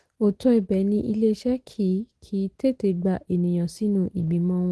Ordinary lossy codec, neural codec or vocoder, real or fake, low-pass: Opus, 16 kbps; none; real; 10.8 kHz